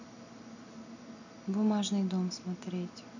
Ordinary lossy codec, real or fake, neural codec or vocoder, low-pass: none; real; none; 7.2 kHz